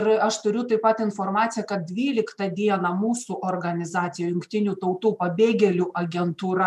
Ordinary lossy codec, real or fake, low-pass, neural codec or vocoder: MP3, 96 kbps; real; 14.4 kHz; none